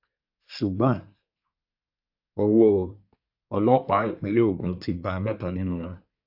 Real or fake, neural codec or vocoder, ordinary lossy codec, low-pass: fake; codec, 24 kHz, 1 kbps, SNAC; AAC, 48 kbps; 5.4 kHz